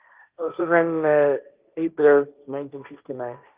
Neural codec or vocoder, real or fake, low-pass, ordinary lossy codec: codec, 16 kHz, 1.1 kbps, Voila-Tokenizer; fake; 3.6 kHz; Opus, 32 kbps